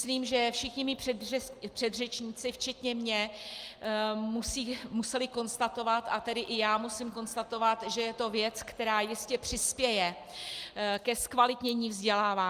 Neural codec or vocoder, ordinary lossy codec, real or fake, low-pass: none; Opus, 32 kbps; real; 14.4 kHz